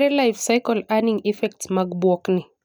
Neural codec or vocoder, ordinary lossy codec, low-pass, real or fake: none; none; none; real